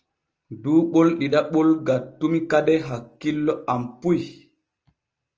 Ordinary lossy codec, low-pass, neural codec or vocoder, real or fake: Opus, 24 kbps; 7.2 kHz; none; real